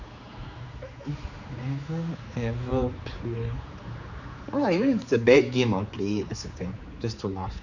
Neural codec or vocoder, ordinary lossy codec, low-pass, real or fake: codec, 16 kHz, 4 kbps, X-Codec, HuBERT features, trained on general audio; none; 7.2 kHz; fake